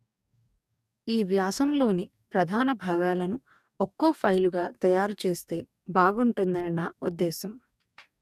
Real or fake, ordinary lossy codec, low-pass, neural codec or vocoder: fake; none; 14.4 kHz; codec, 44.1 kHz, 2.6 kbps, DAC